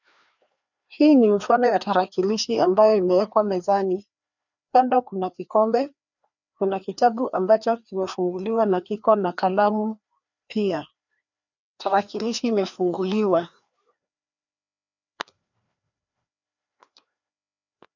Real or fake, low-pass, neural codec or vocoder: fake; 7.2 kHz; codec, 16 kHz, 2 kbps, FreqCodec, larger model